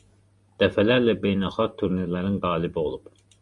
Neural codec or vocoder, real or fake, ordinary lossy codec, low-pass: none; real; Opus, 64 kbps; 10.8 kHz